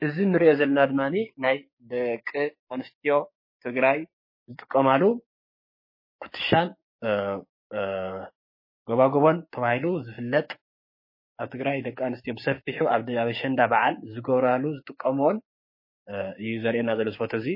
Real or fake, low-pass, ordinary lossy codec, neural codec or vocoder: fake; 5.4 kHz; MP3, 24 kbps; codec, 16 kHz, 6 kbps, DAC